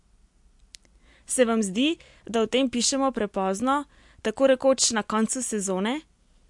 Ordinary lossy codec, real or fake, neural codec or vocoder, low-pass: MP3, 64 kbps; real; none; 10.8 kHz